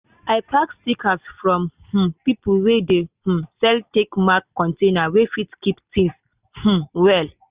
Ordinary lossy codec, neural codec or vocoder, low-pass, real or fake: Opus, 64 kbps; none; 3.6 kHz; real